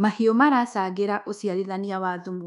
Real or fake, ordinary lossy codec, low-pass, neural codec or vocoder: fake; none; 10.8 kHz; codec, 24 kHz, 1.2 kbps, DualCodec